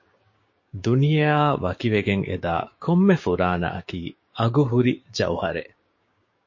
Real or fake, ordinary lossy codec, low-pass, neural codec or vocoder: fake; MP3, 32 kbps; 7.2 kHz; codec, 16 kHz, 6 kbps, DAC